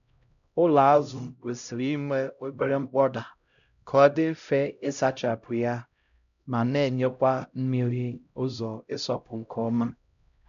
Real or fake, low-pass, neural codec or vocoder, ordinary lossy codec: fake; 7.2 kHz; codec, 16 kHz, 0.5 kbps, X-Codec, HuBERT features, trained on LibriSpeech; none